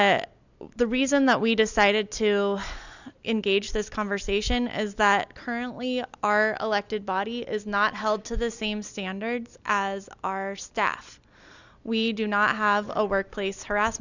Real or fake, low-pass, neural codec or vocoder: real; 7.2 kHz; none